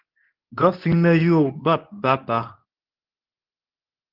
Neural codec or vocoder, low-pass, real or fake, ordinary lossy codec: codec, 24 kHz, 0.9 kbps, WavTokenizer, medium speech release version 2; 5.4 kHz; fake; Opus, 32 kbps